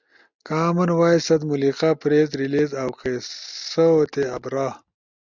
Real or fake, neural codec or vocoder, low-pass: real; none; 7.2 kHz